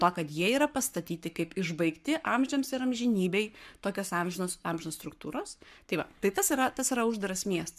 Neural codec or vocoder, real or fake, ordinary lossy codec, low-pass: codec, 44.1 kHz, 7.8 kbps, Pupu-Codec; fake; MP3, 96 kbps; 14.4 kHz